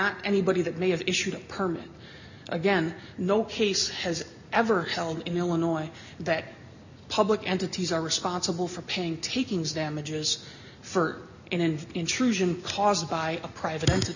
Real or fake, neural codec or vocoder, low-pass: real; none; 7.2 kHz